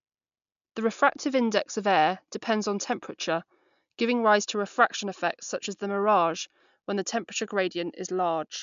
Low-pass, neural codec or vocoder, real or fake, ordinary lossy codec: 7.2 kHz; none; real; MP3, 64 kbps